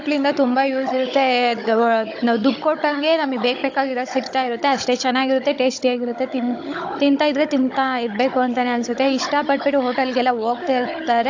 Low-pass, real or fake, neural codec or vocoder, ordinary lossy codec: 7.2 kHz; fake; codec, 16 kHz, 16 kbps, FunCodec, trained on Chinese and English, 50 frames a second; AAC, 48 kbps